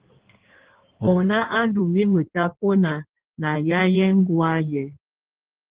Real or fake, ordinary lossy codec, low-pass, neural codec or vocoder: fake; Opus, 16 kbps; 3.6 kHz; codec, 16 kHz in and 24 kHz out, 1.1 kbps, FireRedTTS-2 codec